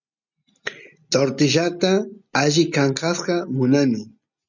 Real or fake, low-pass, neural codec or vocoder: real; 7.2 kHz; none